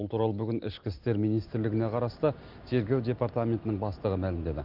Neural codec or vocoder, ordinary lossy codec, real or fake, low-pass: none; Opus, 24 kbps; real; 5.4 kHz